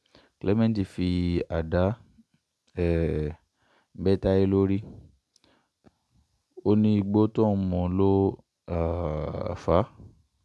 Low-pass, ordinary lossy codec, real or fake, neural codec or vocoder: none; none; real; none